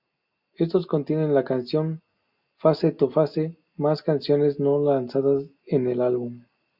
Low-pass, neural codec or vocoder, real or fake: 5.4 kHz; none; real